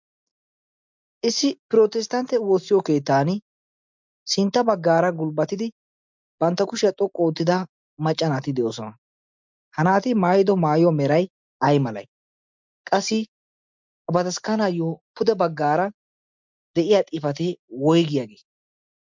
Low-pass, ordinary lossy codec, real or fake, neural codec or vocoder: 7.2 kHz; MP3, 64 kbps; real; none